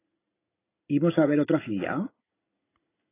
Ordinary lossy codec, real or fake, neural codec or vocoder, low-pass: AAC, 16 kbps; real; none; 3.6 kHz